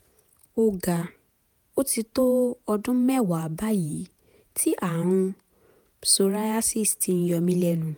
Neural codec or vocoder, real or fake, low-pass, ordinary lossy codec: vocoder, 48 kHz, 128 mel bands, Vocos; fake; none; none